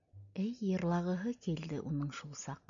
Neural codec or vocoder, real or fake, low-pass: none; real; 7.2 kHz